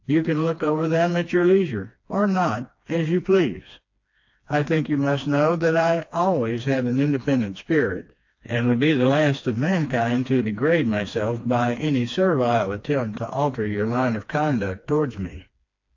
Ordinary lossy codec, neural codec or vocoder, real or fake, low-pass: AAC, 48 kbps; codec, 16 kHz, 2 kbps, FreqCodec, smaller model; fake; 7.2 kHz